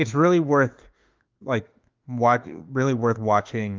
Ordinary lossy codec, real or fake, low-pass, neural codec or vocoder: Opus, 32 kbps; fake; 7.2 kHz; autoencoder, 48 kHz, 32 numbers a frame, DAC-VAE, trained on Japanese speech